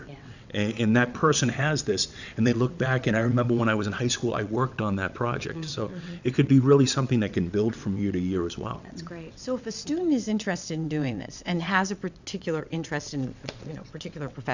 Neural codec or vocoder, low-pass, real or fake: vocoder, 22.05 kHz, 80 mel bands, WaveNeXt; 7.2 kHz; fake